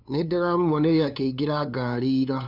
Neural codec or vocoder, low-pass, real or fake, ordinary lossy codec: codec, 16 kHz, 2 kbps, FunCodec, trained on LibriTTS, 25 frames a second; 5.4 kHz; fake; none